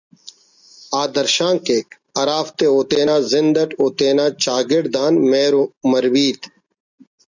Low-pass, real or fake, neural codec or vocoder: 7.2 kHz; real; none